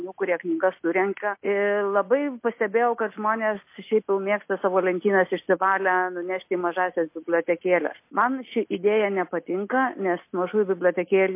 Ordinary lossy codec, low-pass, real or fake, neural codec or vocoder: MP3, 24 kbps; 3.6 kHz; real; none